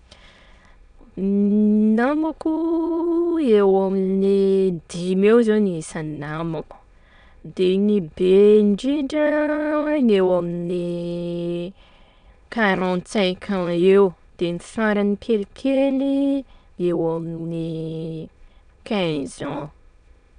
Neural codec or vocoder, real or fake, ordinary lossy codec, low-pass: autoencoder, 22.05 kHz, a latent of 192 numbers a frame, VITS, trained on many speakers; fake; none; 9.9 kHz